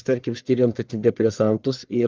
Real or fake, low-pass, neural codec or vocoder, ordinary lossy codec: fake; 7.2 kHz; codec, 44.1 kHz, 1.7 kbps, Pupu-Codec; Opus, 24 kbps